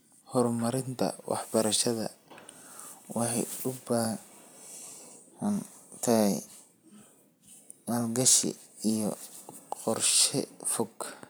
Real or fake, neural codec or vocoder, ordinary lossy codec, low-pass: fake; vocoder, 44.1 kHz, 128 mel bands every 256 samples, BigVGAN v2; none; none